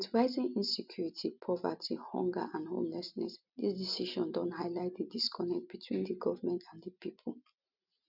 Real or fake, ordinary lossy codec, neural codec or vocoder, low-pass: real; none; none; 5.4 kHz